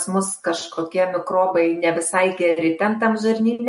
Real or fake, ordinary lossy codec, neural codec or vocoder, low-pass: real; MP3, 48 kbps; none; 14.4 kHz